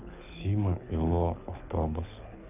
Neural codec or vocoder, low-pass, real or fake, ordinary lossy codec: codec, 24 kHz, 6 kbps, HILCodec; 3.6 kHz; fake; none